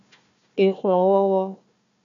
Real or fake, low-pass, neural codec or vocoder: fake; 7.2 kHz; codec, 16 kHz, 1 kbps, FunCodec, trained on Chinese and English, 50 frames a second